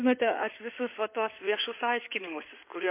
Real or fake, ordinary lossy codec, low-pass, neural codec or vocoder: fake; MP3, 24 kbps; 3.6 kHz; codec, 16 kHz in and 24 kHz out, 2.2 kbps, FireRedTTS-2 codec